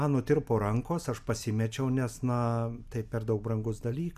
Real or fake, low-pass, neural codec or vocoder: real; 14.4 kHz; none